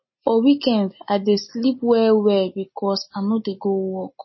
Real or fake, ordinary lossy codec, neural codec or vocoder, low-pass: real; MP3, 24 kbps; none; 7.2 kHz